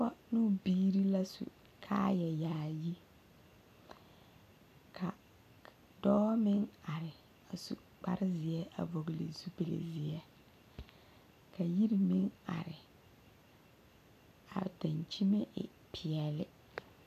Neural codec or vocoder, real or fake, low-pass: none; real; 14.4 kHz